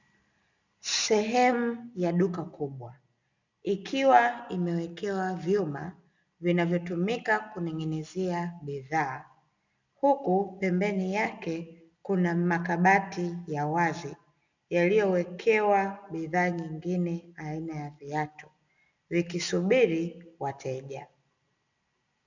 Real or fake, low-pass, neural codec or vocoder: real; 7.2 kHz; none